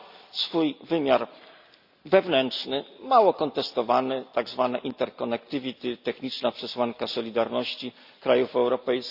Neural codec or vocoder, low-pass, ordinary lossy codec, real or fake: none; 5.4 kHz; Opus, 64 kbps; real